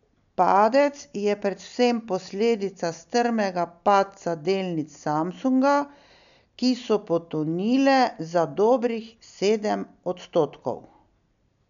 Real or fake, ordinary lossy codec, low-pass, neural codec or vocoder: real; none; 7.2 kHz; none